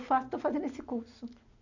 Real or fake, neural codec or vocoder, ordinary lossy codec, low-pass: real; none; none; 7.2 kHz